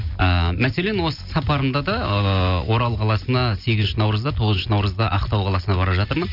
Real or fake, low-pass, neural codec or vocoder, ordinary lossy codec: real; 5.4 kHz; none; none